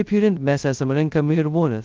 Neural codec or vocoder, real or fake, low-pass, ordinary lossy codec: codec, 16 kHz, 0.2 kbps, FocalCodec; fake; 7.2 kHz; Opus, 32 kbps